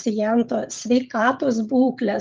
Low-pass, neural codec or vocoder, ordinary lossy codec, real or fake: 7.2 kHz; codec, 16 kHz, 16 kbps, FreqCodec, smaller model; Opus, 24 kbps; fake